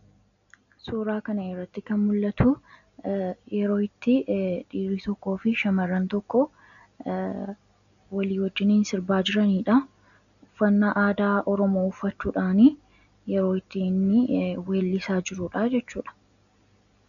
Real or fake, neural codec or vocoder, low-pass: real; none; 7.2 kHz